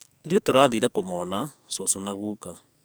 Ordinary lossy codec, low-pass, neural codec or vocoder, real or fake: none; none; codec, 44.1 kHz, 2.6 kbps, SNAC; fake